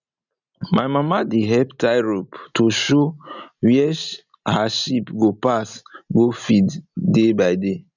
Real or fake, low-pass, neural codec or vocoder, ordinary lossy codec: real; 7.2 kHz; none; none